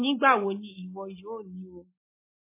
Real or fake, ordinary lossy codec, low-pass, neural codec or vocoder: real; MP3, 16 kbps; 3.6 kHz; none